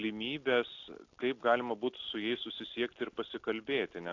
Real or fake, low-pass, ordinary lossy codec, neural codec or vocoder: real; 7.2 kHz; AAC, 48 kbps; none